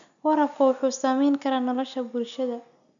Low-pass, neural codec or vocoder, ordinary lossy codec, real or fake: 7.2 kHz; none; none; real